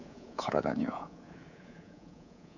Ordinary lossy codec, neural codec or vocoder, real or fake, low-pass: none; codec, 24 kHz, 3.1 kbps, DualCodec; fake; 7.2 kHz